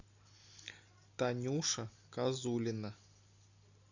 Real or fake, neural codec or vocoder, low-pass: real; none; 7.2 kHz